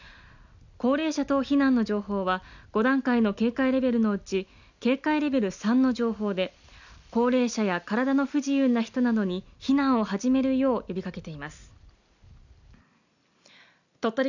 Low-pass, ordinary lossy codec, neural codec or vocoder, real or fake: 7.2 kHz; none; none; real